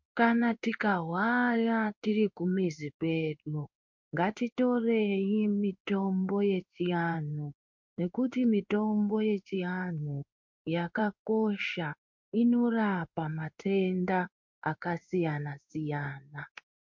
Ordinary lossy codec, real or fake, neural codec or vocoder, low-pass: MP3, 48 kbps; fake; codec, 16 kHz in and 24 kHz out, 1 kbps, XY-Tokenizer; 7.2 kHz